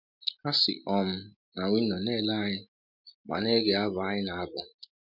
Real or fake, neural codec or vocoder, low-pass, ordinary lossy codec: real; none; 5.4 kHz; MP3, 48 kbps